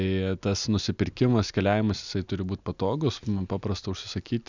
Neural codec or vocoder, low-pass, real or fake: none; 7.2 kHz; real